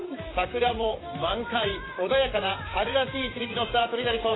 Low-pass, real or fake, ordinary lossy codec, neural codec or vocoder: 7.2 kHz; fake; AAC, 16 kbps; vocoder, 44.1 kHz, 128 mel bands, Pupu-Vocoder